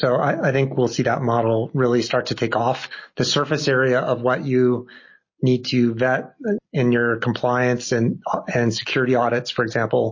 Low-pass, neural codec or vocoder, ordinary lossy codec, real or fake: 7.2 kHz; none; MP3, 32 kbps; real